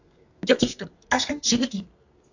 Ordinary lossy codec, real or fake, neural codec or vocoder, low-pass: AAC, 48 kbps; fake; codec, 32 kHz, 1.9 kbps, SNAC; 7.2 kHz